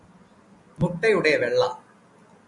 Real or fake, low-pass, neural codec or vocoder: real; 10.8 kHz; none